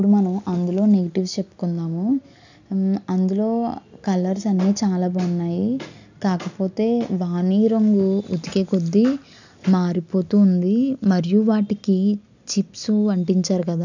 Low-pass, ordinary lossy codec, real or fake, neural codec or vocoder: 7.2 kHz; none; real; none